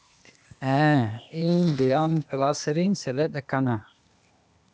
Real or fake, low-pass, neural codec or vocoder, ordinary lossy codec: fake; none; codec, 16 kHz, 0.8 kbps, ZipCodec; none